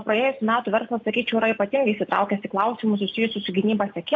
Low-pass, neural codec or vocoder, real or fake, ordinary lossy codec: 7.2 kHz; none; real; AAC, 48 kbps